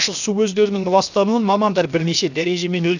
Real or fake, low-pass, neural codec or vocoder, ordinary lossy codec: fake; 7.2 kHz; codec, 16 kHz, 0.7 kbps, FocalCodec; none